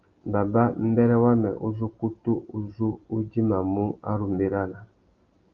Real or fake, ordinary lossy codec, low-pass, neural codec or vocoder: real; Opus, 32 kbps; 7.2 kHz; none